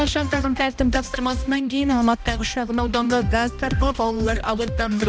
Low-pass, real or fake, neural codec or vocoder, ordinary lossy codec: none; fake; codec, 16 kHz, 1 kbps, X-Codec, HuBERT features, trained on balanced general audio; none